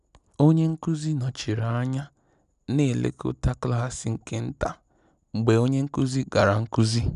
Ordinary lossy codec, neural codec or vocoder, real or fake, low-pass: none; none; real; 10.8 kHz